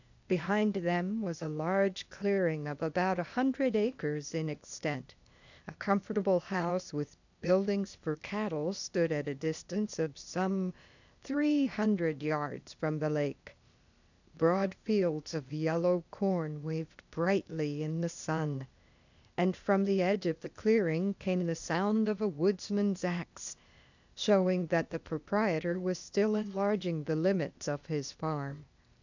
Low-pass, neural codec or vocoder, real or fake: 7.2 kHz; codec, 16 kHz, 0.8 kbps, ZipCodec; fake